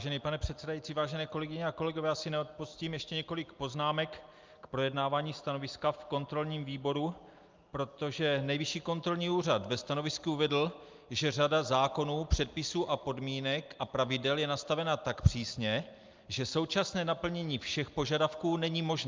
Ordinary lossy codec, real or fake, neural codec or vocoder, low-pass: Opus, 24 kbps; real; none; 7.2 kHz